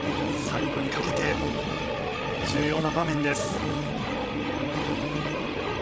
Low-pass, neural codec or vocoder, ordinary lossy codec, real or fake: none; codec, 16 kHz, 16 kbps, FreqCodec, larger model; none; fake